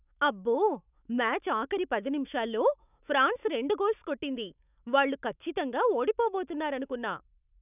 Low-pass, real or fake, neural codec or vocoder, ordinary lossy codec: 3.6 kHz; fake; autoencoder, 48 kHz, 128 numbers a frame, DAC-VAE, trained on Japanese speech; none